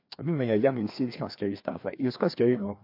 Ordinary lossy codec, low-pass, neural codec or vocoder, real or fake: MP3, 32 kbps; 5.4 kHz; codec, 16 kHz, 2 kbps, FreqCodec, larger model; fake